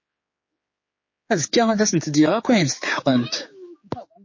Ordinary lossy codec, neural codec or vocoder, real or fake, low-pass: MP3, 32 kbps; codec, 16 kHz, 4 kbps, X-Codec, HuBERT features, trained on general audio; fake; 7.2 kHz